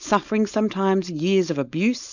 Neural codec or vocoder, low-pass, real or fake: codec, 16 kHz, 4.8 kbps, FACodec; 7.2 kHz; fake